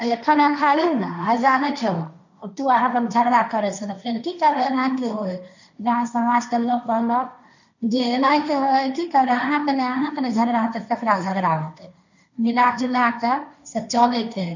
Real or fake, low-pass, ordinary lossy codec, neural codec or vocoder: fake; 7.2 kHz; none; codec, 16 kHz, 1.1 kbps, Voila-Tokenizer